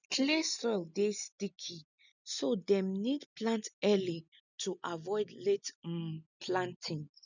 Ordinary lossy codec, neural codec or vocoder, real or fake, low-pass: none; none; real; 7.2 kHz